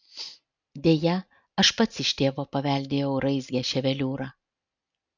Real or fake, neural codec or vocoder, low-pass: real; none; 7.2 kHz